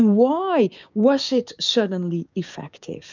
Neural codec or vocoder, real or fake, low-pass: codec, 16 kHz, 6 kbps, DAC; fake; 7.2 kHz